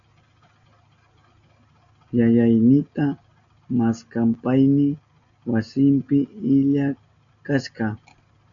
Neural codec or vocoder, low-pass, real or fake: none; 7.2 kHz; real